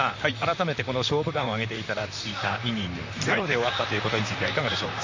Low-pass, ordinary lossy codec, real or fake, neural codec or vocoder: 7.2 kHz; MP3, 48 kbps; fake; vocoder, 44.1 kHz, 128 mel bands, Pupu-Vocoder